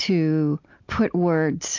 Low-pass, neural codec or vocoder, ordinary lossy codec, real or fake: 7.2 kHz; none; AAC, 48 kbps; real